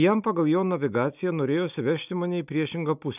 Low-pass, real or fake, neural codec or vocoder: 3.6 kHz; real; none